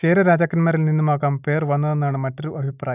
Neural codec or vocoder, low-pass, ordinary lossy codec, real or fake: none; 3.6 kHz; none; real